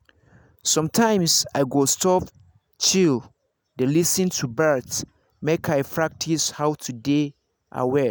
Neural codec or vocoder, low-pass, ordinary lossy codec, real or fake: none; none; none; real